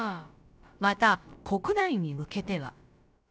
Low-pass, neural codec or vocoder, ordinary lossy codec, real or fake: none; codec, 16 kHz, about 1 kbps, DyCAST, with the encoder's durations; none; fake